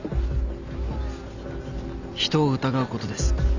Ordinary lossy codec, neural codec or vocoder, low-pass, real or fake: none; none; 7.2 kHz; real